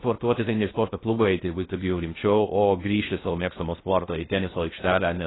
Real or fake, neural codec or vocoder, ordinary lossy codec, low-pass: fake; codec, 16 kHz in and 24 kHz out, 0.6 kbps, FocalCodec, streaming, 2048 codes; AAC, 16 kbps; 7.2 kHz